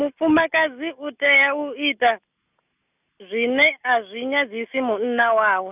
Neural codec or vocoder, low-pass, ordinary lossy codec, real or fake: none; 3.6 kHz; none; real